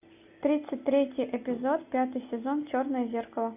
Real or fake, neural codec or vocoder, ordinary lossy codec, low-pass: real; none; AAC, 32 kbps; 3.6 kHz